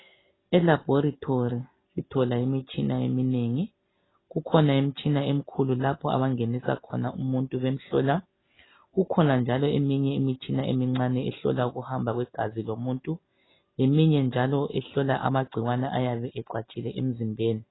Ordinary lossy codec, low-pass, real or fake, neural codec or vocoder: AAC, 16 kbps; 7.2 kHz; real; none